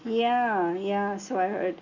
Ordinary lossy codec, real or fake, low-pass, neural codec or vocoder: none; real; 7.2 kHz; none